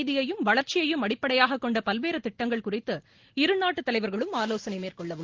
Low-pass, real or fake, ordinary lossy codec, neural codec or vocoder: 7.2 kHz; real; Opus, 16 kbps; none